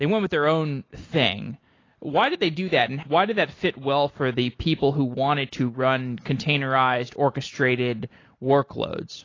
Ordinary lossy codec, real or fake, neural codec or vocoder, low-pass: AAC, 32 kbps; real; none; 7.2 kHz